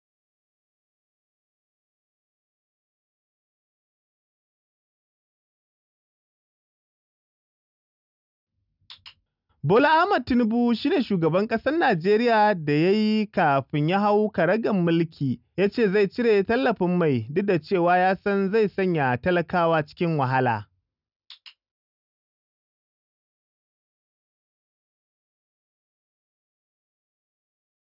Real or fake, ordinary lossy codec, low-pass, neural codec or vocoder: real; none; 5.4 kHz; none